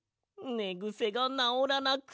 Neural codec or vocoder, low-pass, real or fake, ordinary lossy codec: none; none; real; none